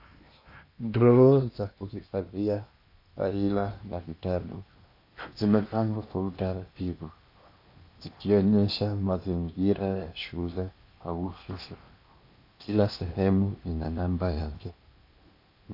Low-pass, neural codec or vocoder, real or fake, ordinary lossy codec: 5.4 kHz; codec, 16 kHz in and 24 kHz out, 0.8 kbps, FocalCodec, streaming, 65536 codes; fake; MP3, 32 kbps